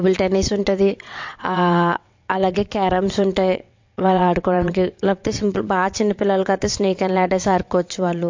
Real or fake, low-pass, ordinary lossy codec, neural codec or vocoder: fake; 7.2 kHz; MP3, 48 kbps; vocoder, 22.05 kHz, 80 mel bands, WaveNeXt